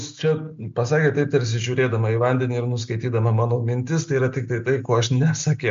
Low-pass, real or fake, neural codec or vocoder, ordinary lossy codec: 7.2 kHz; real; none; MP3, 48 kbps